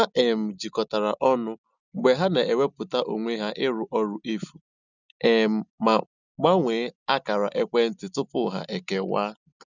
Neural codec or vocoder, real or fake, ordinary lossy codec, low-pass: none; real; none; 7.2 kHz